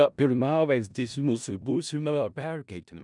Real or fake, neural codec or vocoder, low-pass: fake; codec, 16 kHz in and 24 kHz out, 0.4 kbps, LongCat-Audio-Codec, four codebook decoder; 10.8 kHz